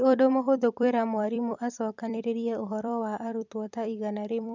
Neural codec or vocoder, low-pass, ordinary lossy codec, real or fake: vocoder, 44.1 kHz, 128 mel bands every 256 samples, BigVGAN v2; 7.2 kHz; none; fake